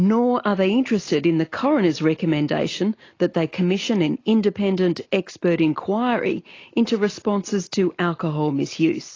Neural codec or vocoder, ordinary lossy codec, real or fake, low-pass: none; AAC, 32 kbps; real; 7.2 kHz